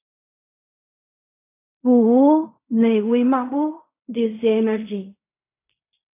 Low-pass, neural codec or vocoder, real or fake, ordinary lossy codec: 3.6 kHz; codec, 16 kHz in and 24 kHz out, 0.4 kbps, LongCat-Audio-Codec, fine tuned four codebook decoder; fake; AAC, 24 kbps